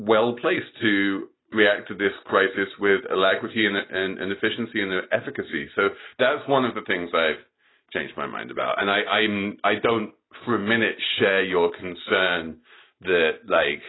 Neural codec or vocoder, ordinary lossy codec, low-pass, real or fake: none; AAC, 16 kbps; 7.2 kHz; real